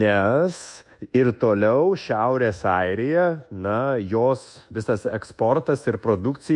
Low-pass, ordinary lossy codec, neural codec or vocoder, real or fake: 10.8 kHz; MP3, 64 kbps; codec, 24 kHz, 1.2 kbps, DualCodec; fake